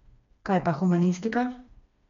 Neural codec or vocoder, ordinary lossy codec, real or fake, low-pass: codec, 16 kHz, 2 kbps, FreqCodec, smaller model; MP3, 48 kbps; fake; 7.2 kHz